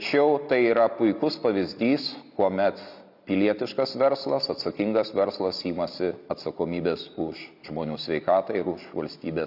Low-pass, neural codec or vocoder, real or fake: 5.4 kHz; none; real